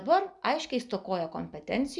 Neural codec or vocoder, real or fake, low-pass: none; real; 10.8 kHz